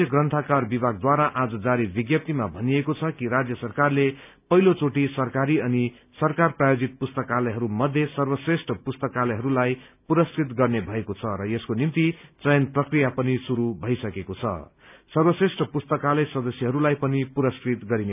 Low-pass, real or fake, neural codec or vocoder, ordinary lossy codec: 3.6 kHz; real; none; none